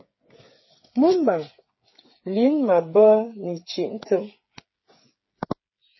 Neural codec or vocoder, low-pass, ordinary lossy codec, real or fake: codec, 16 kHz, 8 kbps, FreqCodec, smaller model; 7.2 kHz; MP3, 24 kbps; fake